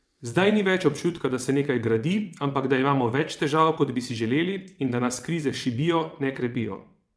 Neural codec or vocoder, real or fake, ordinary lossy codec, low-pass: vocoder, 22.05 kHz, 80 mel bands, WaveNeXt; fake; none; none